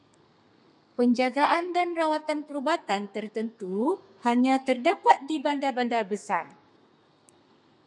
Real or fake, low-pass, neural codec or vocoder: fake; 10.8 kHz; codec, 32 kHz, 1.9 kbps, SNAC